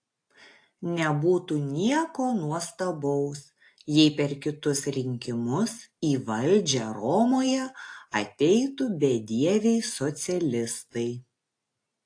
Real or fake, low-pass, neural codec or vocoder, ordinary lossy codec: real; 9.9 kHz; none; AAC, 48 kbps